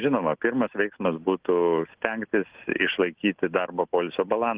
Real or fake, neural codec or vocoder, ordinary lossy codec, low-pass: real; none; Opus, 16 kbps; 3.6 kHz